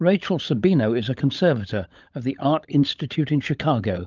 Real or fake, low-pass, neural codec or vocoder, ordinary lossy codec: fake; 7.2 kHz; codec, 16 kHz, 16 kbps, FunCodec, trained on LibriTTS, 50 frames a second; Opus, 32 kbps